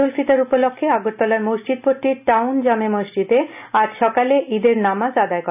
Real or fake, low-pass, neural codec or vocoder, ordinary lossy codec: real; 3.6 kHz; none; none